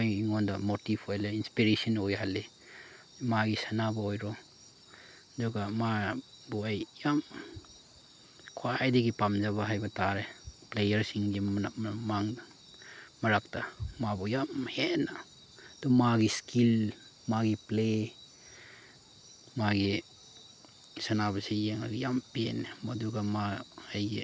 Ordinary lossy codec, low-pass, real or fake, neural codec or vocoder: none; none; real; none